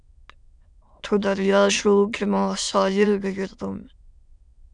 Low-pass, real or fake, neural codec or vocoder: 9.9 kHz; fake; autoencoder, 22.05 kHz, a latent of 192 numbers a frame, VITS, trained on many speakers